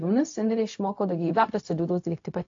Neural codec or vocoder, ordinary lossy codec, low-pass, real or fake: codec, 16 kHz, 0.4 kbps, LongCat-Audio-Codec; AAC, 48 kbps; 7.2 kHz; fake